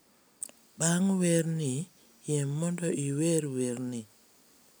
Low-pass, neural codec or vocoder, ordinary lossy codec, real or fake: none; none; none; real